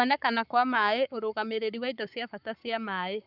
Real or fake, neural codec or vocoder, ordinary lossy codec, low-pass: fake; codec, 44.1 kHz, 7.8 kbps, Pupu-Codec; none; 5.4 kHz